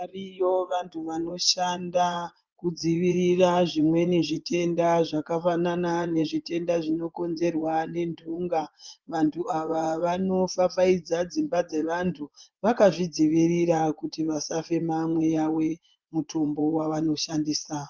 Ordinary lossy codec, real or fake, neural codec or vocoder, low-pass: Opus, 32 kbps; fake; vocoder, 24 kHz, 100 mel bands, Vocos; 7.2 kHz